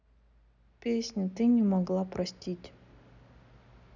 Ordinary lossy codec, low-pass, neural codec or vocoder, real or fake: none; 7.2 kHz; none; real